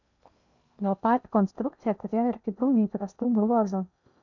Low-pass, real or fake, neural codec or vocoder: 7.2 kHz; fake; codec, 16 kHz in and 24 kHz out, 0.8 kbps, FocalCodec, streaming, 65536 codes